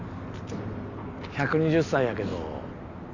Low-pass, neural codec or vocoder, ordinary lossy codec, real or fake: 7.2 kHz; none; none; real